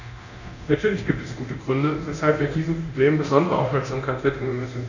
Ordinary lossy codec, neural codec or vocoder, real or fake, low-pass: none; codec, 24 kHz, 0.9 kbps, DualCodec; fake; 7.2 kHz